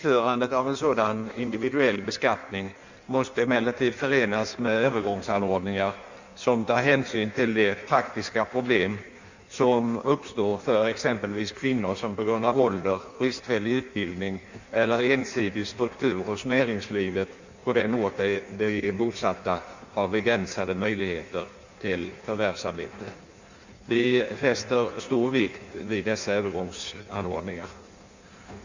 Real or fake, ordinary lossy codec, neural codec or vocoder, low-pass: fake; Opus, 64 kbps; codec, 16 kHz in and 24 kHz out, 1.1 kbps, FireRedTTS-2 codec; 7.2 kHz